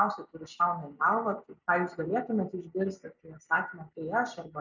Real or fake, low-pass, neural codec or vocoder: real; 7.2 kHz; none